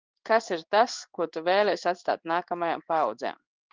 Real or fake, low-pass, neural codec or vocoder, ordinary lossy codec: fake; 7.2 kHz; codec, 16 kHz in and 24 kHz out, 1 kbps, XY-Tokenizer; Opus, 24 kbps